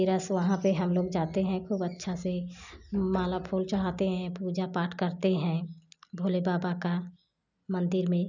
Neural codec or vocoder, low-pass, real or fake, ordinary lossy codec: none; 7.2 kHz; real; none